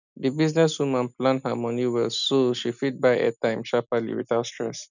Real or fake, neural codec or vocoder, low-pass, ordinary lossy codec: real; none; 7.2 kHz; none